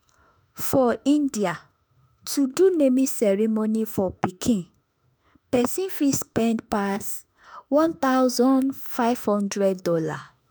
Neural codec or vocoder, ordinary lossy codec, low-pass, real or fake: autoencoder, 48 kHz, 32 numbers a frame, DAC-VAE, trained on Japanese speech; none; none; fake